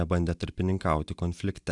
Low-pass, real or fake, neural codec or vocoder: 10.8 kHz; real; none